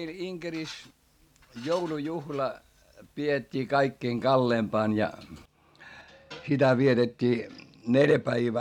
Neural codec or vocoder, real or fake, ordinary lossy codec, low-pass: none; real; none; 19.8 kHz